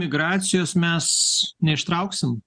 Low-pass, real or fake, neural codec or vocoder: 9.9 kHz; real; none